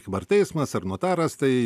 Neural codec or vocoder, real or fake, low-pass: none; real; 14.4 kHz